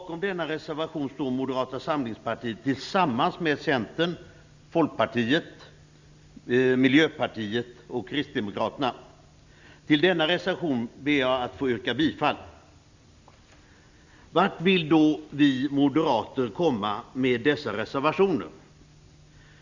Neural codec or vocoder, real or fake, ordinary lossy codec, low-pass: none; real; Opus, 64 kbps; 7.2 kHz